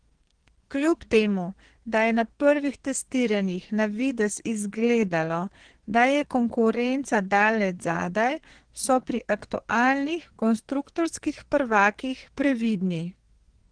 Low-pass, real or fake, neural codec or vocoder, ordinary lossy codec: 9.9 kHz; fake; codec, 44.1 kHz, 2.6 kbps, SNAC; Opus, 16 kbps